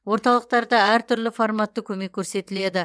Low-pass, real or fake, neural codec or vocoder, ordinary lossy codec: none; fake; vocoder, 22.05 kHz, 80 mel bands, WaveNeXt; none